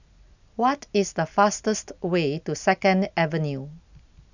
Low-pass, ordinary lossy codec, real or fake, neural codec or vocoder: 7.2 kHz; none; real; none